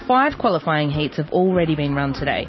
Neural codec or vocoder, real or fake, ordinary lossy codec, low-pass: none; real; MP3, 24 kbps; 7.2 kHz